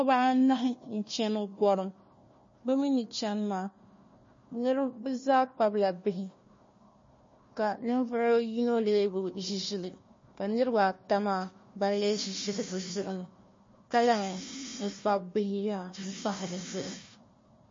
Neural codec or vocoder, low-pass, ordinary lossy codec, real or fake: codec, 16 kHz, 1 kbps, FunCodec, trained on Chinese and English, 50 frames a second; 7.2 kHz; MP3, 32 kbps; fake